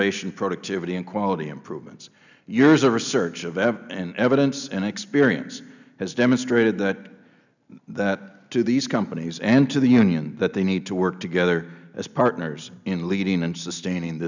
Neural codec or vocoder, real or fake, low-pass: none; real; 7.2 kHz